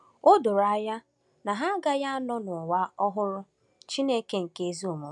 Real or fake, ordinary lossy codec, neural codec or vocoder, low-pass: real; none; none; 10.8 kHz